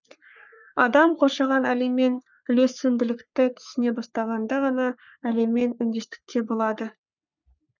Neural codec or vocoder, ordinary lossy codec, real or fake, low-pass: codec, 44.1 kHz, 3.4 kbps, Pupu-Codec; none; fake; 7.2 kHz